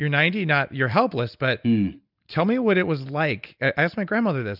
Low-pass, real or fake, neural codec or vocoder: 5.4 kHz; real; none